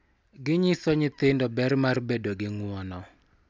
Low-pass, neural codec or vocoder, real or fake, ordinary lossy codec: none; none; real; none